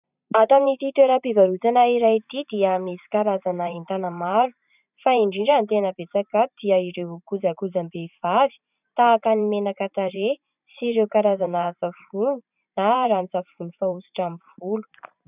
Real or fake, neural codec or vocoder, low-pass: fake; vocoder, 44.1 kHz, 128 mel bands every 512 samples, BigVGAN v2; 3.6 kHz